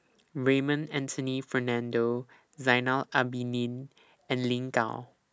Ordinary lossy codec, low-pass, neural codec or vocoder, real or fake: none; none; none; real